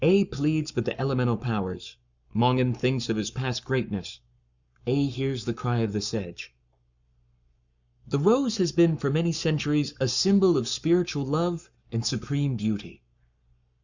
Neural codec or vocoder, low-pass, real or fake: codec, 44.1 kHz, 7.8 kbps, Pupu-Codec; 7.2 kHz; fake